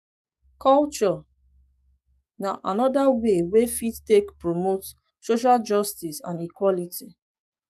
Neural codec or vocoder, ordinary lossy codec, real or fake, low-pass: codec, 44.1 kHz, 7.8 kbps, DAC; none; fake; 14.4 kHz